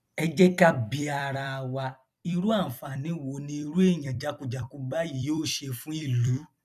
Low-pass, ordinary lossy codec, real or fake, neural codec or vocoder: 14.4 kHz; none; fake; vocoder, 44.1 kHz, 128 mel bands every 256 samples, BigVGAN v2